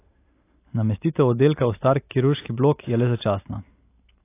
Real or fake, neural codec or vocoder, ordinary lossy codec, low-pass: real; none; AAC, 24 kbps; 3.6 kHz